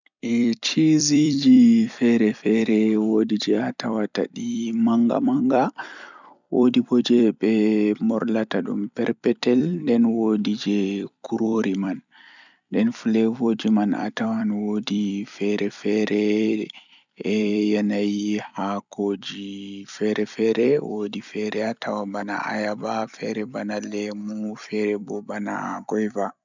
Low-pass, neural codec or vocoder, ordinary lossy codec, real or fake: 7.2 kHz; vocoder, 44.1 kHz, 128 mel bands every 256 samples, BigVGAN v2; none; fake